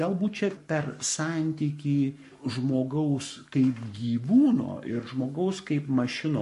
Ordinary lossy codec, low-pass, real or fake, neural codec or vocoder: MP3, 48 kbps; 14.4 kHz; fake; codec, 44.1 kHz, 7.8 kbps, Pupu-Codec